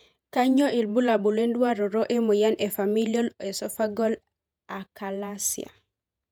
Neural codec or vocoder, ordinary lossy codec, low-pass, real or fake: vocoder, 48 kHz, 128 mel bands, Vocos; none; 19.8 kHz; fake